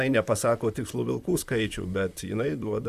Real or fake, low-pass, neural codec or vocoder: fake; 14.4 kHz; vocoder, 44.1 kHz, 128 mel bands, Pupu-Vocoder